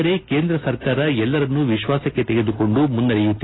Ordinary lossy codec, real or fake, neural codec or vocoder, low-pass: AAC, 16 kbps; real; none; 7.2 kHz